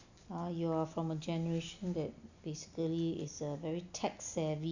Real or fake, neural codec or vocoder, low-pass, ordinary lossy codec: real; none; 7.2 kHz; none